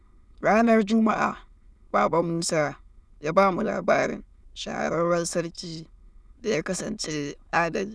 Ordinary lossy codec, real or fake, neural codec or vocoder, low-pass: none; fake; autoencoder, 22.05 kHz, a latent of 192 numbers a frame, VITS, trained on many speakers; none